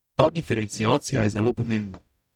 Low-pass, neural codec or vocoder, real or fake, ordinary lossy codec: 19.8 kHz; codec, 44.1 kHz, 0.9 kbps, DAC; fake; none